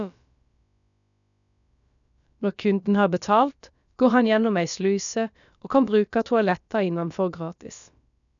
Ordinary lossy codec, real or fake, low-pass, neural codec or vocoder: none; fake; 7.2 kHz; codec, 16 kHz, about 1 kbps, DyCAST, with the encoder's durations